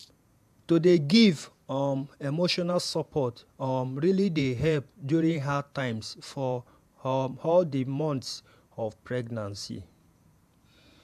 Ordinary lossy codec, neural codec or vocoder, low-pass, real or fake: AAC, 96 kbps; vocoder, 48 kHz, 128 mel bands, Vocos; 14.4 kHz; fake